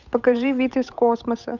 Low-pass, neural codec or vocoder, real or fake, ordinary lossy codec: 7.2 kHz; codec, 16 kHz, 8 kbps, FunCodec, trained on Chinese and English, 25 frames a second; fake; none